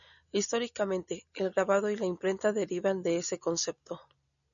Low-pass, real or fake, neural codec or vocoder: 7.2 kHz; real; none